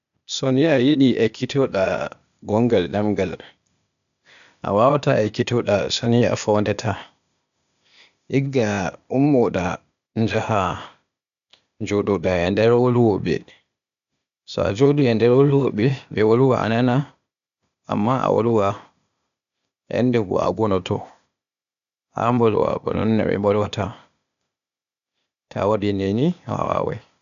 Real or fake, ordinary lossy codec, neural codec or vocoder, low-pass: fake; none; codec, 16 kHz, 0.8 kbps, ZipCodec; 7.2 kHz